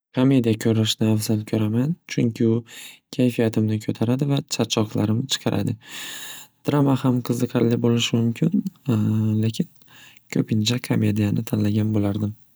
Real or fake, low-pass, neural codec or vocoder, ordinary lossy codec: real; none; none; none